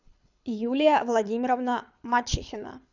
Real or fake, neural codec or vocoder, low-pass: fake; codec, 24 kHz, 6 kbps, HILCodec; 7.2 kHz